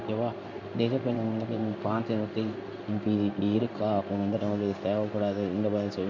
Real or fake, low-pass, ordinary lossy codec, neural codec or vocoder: fake; 7.2 kHz; MP3, 48 kbps; codec, 16 kHz in and 24 kHz out, 1 kbps, XY-Tokenizer